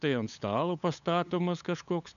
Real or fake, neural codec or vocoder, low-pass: fake; codec, 16 kHz, 8 kbps, FunCodec, trained on LibriTTS, 25 frames a second; 7.2 kHz